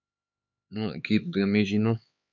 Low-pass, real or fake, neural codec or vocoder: 7.2 kHz; fake; codec, 16 kHz, 4 kbps, X-Codec, HuBERT features, trained on LibriSpeech